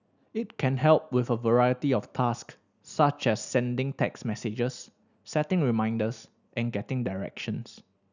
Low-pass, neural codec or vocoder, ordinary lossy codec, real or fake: 7.2 kHz; none; none; real